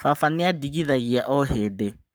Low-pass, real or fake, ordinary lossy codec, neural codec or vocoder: none; fake; none; codec, 44.1 kHz, 7.8 kbps, Pupu-Codec